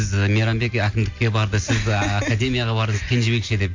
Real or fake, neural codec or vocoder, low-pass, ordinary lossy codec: real; none; 7.2 kHz; MP3, 48 kbps